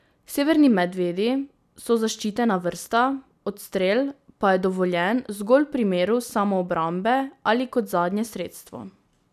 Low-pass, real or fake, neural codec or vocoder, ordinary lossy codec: 14.4 kHz; real; none; none